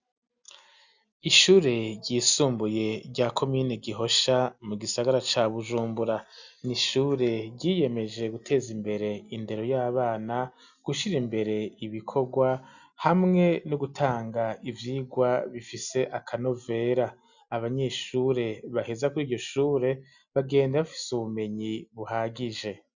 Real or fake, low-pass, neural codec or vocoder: real; 7.2 kHz; none